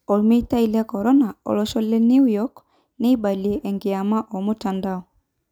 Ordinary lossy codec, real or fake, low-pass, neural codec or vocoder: none; real; 19.8 kHz; none